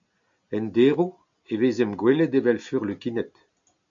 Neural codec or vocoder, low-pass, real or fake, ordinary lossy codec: none; 7.2 kHz; real; MP3, 64 kbps